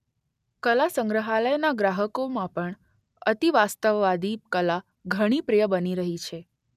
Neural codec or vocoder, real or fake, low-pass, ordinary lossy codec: none; real; 14.4 kHz; none